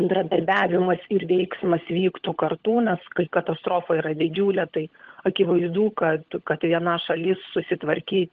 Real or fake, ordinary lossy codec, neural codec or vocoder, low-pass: fake; Opus, 16 kbps; codec, 16 kHz, 16 kbps, FunCodec, trained on LibriTTS, 50 frames a second; 7.2 kHz